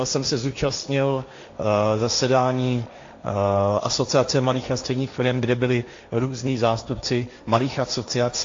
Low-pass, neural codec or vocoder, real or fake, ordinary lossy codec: 7.2 kHz; codec, 16 kHz, 1.1 kbps, Voila-Tokenizer; fake; AAC, 48 kbps